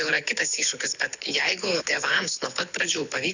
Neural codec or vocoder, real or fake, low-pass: codec, 24 kHz, 6 kbps, HILCodec; fake; 7.2 kHz